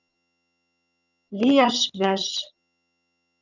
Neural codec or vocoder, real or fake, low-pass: vocoder, 22.05 kHz, 80 mel bands, HiFi-GAN; fake; 7.2 kHz